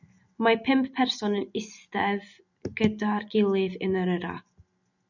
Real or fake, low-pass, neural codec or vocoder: real; 7.2 kHz; none